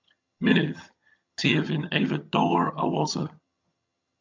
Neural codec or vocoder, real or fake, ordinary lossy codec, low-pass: vocoder, 22.05 kHz, 80 mel bands, HiFi-GAN; fake; MP3, 64 kbps; 7.2 kHz